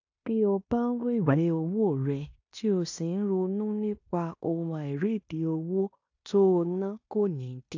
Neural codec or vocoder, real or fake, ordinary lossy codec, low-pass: codec, 16 kHz in and 24 kHz out, 0.9 kbps, LongCat-Audio-Codec, fine tuned four codebook decoder; fake; AAC, 48 kbps; 7.2 kHz